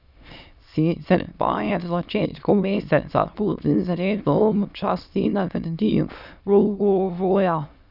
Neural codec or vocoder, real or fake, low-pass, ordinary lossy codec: autoencoder, 22.05 kHz, a latent of 192 numbers a frame, VITS, trained on many speakers; fake; 5.4 kHz; none